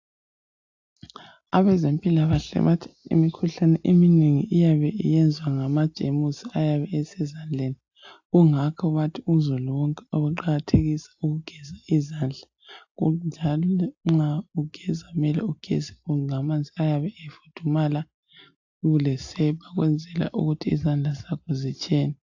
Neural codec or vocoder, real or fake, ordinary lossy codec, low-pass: none; real; AAC, 48 kbps; 7.2 kHz